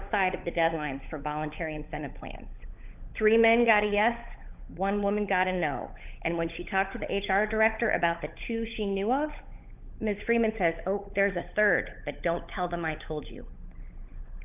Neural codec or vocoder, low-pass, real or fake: codec, 16 kHz, 16 kbps, FunCodec, trained on LibriTTS, 50 frames a second; 3.6 kHz; fake